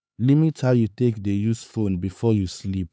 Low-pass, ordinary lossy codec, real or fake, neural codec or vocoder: none; none; fake; codec, 16 kHz, 4 kbps, X-Codec, HuBERT features, trained on LibriSpeech